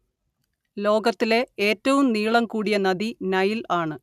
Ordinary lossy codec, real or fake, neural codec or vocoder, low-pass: none; real; none; 14.4 kHz